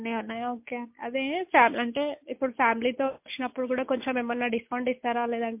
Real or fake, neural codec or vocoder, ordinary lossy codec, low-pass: real; none; MP3, 32 kbps; 3.6 kHz